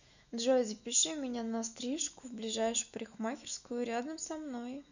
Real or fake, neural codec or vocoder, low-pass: fake; autoencoder, 48 kHz, 128 numbers a frame, DAC-VAE, trained on Japanese speech; 7.2 kHz